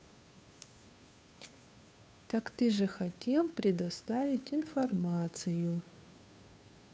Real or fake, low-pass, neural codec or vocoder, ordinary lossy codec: fake; none; codec, 16 kHz, 2 kbps, FunCodec, trained on Chinese and English, 25 frames a second; none